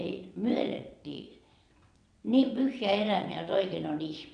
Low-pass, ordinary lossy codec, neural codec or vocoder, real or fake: 9.9 kHz; MP3, 96 kbps; vocoder, 22.05 kHz, 80 mel bands, WaveNeXt; fake